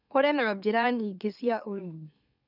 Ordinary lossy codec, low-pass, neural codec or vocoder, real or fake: none; 5.4 kHz; autoencoder, 44.1 kHz, a latent of 192 numbers a frame, MeloTTS; fake